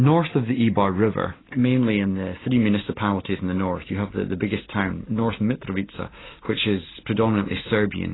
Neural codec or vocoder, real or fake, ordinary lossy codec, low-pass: none; real; AAC, 16 kbps; 7.2 kHz